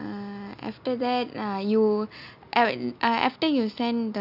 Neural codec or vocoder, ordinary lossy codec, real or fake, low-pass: none; none; real; 5.4 kHz